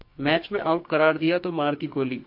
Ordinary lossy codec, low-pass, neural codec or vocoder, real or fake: MP3, 32 kbps; 5.4 kHz; codec, 44.1 kHz, 2.6 kbps, SNAC; fake